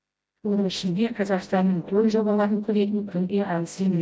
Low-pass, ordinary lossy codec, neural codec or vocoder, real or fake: none; none; codec, 16 kHz, 0.5 kbps, FreqCodec, smaller model; fake